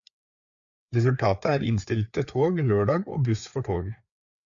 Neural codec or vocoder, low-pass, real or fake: codec, 16 kHz, 4 kbps, FreqCodec, larger model; 7.2 kHz; fake